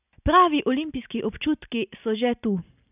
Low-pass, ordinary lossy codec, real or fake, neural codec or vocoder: 3.6 kHz; none; real; none